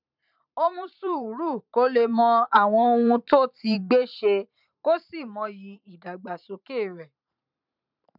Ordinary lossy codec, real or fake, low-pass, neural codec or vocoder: none; fake; 5.4 kHz; vocoder, 44.1 kHz, 128 mel bands every 512 samples, BigVGAN v2